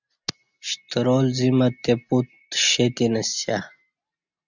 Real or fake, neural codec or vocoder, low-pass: real; none; 7.2 kHz